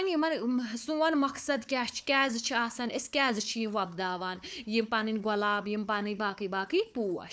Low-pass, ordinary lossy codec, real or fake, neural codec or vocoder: none; none; fake; codec, 16 kHz, 4 kbps, FunCodec, trained on Chinese and English, 50 frames a second